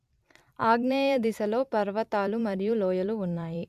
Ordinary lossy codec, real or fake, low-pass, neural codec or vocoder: AAC, 96 kbps; fake; 14.4 kHz; vocoder, 44.1 kHz, 128 mel bands every 256 samples, BigVGAN v2